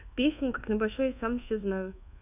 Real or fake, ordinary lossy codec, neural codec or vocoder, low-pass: fake; none; codec, 24 kHz, 1.2 kbps, DualCodec; 3.6 kHz